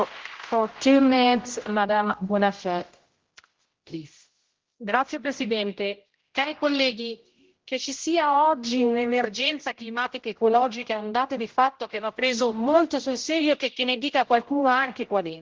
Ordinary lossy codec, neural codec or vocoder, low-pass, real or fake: Opus, 16 kbps; codec, 16 kHz, 0.5 kbps, X-Codec, HuBERT features, trained on general audio; 7.2 kHz; fake